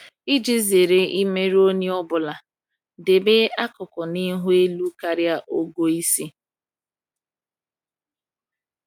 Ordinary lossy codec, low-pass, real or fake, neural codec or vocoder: none; 19.8 kHz; real; none